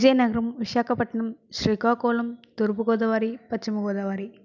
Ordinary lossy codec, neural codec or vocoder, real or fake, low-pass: none; none; real; 7.2 kHz